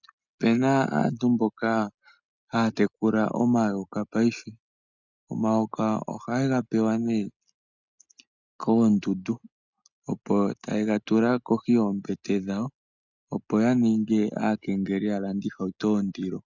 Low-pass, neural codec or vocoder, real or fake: 7.2 kHz; none; real